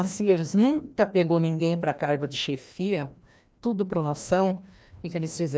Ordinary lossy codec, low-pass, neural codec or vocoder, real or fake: none; none; codec, 16 kHz, 1 kbps, FreqCodec, larger model; fake